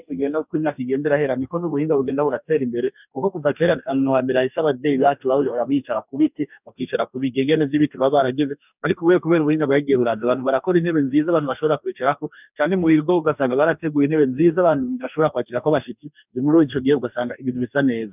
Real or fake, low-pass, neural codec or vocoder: fake; 3.6 kHz; codec, 44.1 kHz, 2.6 kbps, DAC